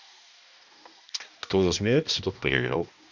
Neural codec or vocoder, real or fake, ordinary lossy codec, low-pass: codec, 16 kHz, 1 kbps, X-Codec, HuBERT features, trained on balanced general audio; fake; none; 7.2 kHz